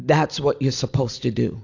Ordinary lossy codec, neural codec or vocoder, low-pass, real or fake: AAC, 48 kbps; none; 7.2 kHz; real